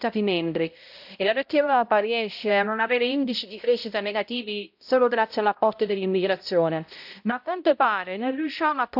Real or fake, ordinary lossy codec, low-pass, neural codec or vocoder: fake; AAC, 48 kbps; 5.4 kHz; codec, 16 kHz, 0.5 kbps, X-Codec, HuBERT features, trained on balanced general audio